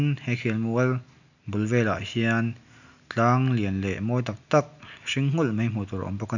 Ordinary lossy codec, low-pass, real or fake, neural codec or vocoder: none; 7.2 kHz; real; none